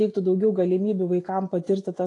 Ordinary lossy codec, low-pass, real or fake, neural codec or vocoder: AAC, 48 kbps; 10.8 kHz; real; none